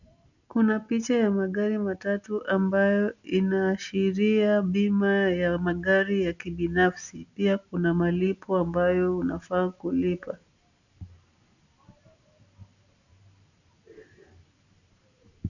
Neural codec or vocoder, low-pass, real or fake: none; 7.2 kHz; real